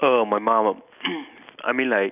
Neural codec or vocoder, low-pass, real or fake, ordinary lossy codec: none; 3.6 kHz; real; none